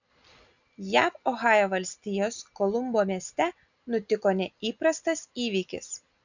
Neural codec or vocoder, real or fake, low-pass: none; real; 7.2 kHz